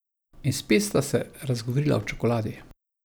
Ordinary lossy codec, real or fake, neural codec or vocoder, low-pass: none; real; none; none